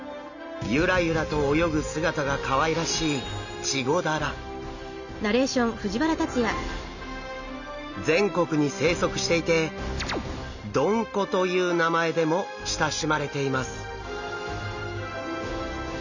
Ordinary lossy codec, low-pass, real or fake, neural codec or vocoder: none; 7.2 kHz; real; none